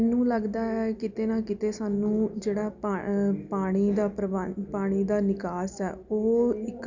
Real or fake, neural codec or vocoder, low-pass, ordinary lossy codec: real; none; 7.2 kHz; none